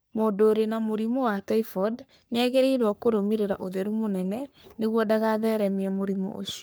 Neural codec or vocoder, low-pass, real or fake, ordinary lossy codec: codec, 44.1 kHz, 3.4 kbps, Pupu-Codec; none; fake; none